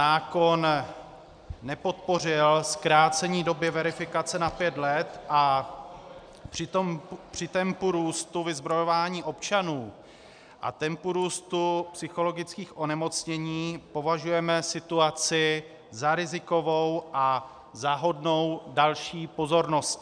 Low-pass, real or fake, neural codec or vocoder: 9.9 kHz; real; none